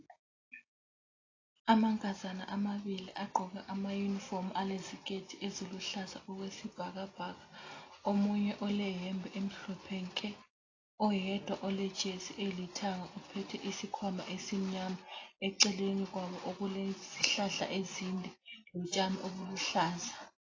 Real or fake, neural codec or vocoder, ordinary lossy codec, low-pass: real; none; AAC, 32 kbps; 7.2 kHz